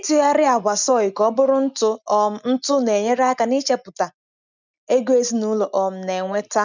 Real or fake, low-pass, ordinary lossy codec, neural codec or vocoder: real; 7.2 kHz; none; none